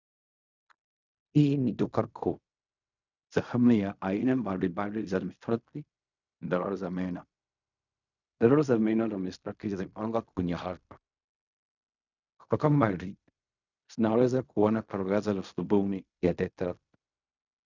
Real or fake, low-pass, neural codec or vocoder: fake; 7.2 kHz; codec, 16 kHz in and 24 kHz out, 0.4 kbps, LongCat-Audio-Codec, fine tuned four codebook decoder